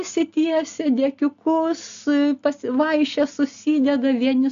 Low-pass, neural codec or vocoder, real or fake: 7.2 kHz; none; real